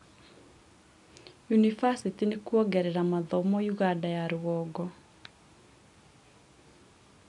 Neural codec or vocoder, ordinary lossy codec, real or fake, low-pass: none; none; real; 10.8 kHz